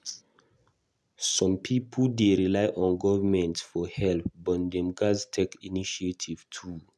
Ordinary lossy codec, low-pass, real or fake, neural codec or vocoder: none; none; real; none